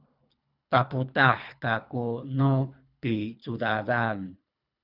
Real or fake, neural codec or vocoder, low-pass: fake; codec, 24 kHz, 3 kbps, HILCodec; 5.4 kHz